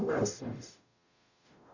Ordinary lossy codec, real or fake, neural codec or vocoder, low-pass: none; fake; codec, 44.1 kHz, 0.9 kbps, DAC; 7.2 kHz